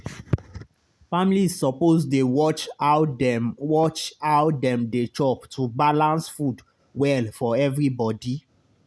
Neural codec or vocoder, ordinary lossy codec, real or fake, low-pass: none; none; real; none